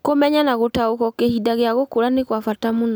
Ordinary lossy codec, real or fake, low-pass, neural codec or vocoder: none; real; none; none